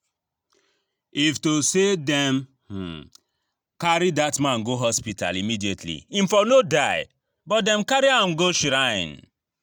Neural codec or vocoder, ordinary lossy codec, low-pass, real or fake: none; none; none; real